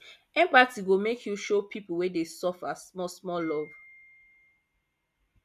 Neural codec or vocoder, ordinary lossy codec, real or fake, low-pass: none; none; real; 14.4 kHz